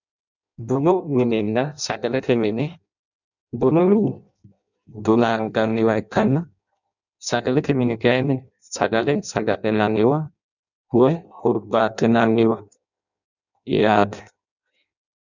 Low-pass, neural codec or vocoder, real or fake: 7.2 kHz; codec, 16 kHz in and 24 kHz out, 0.6 kbps, FireRedTTS-2 codec; fake